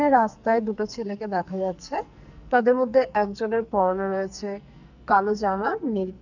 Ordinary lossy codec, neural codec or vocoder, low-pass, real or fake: none; codec, 44.1 kHz, 2.6 kbps, SNAC; 7.2 kHz; fake